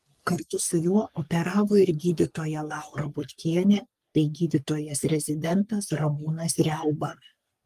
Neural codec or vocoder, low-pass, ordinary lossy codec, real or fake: codec, 44.1 kHz, 3.4 kbps, Pupu-Codec; 14.4 kHz; Opus, 32 kbps; fake